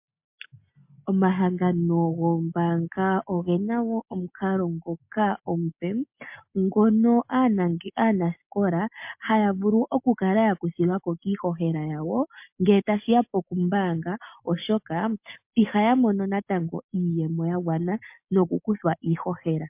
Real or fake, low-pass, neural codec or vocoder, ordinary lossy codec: real; 3.6 kHz; none; MP3, 32 kbps